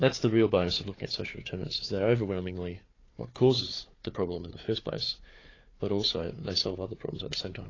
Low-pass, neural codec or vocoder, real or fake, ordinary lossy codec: 7.2 kHz; codec, 16 kHz, 4 kbps, FunCodec, trained on Chinese and English, 50 frames a second; fake; AAC, 32 kbps